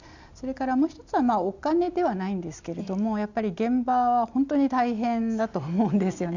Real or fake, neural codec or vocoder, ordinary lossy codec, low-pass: real; none; none; 7.2 kHz